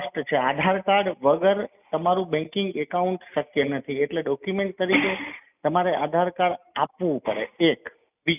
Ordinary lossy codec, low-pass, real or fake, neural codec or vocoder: none; 3.6 kHz; real; none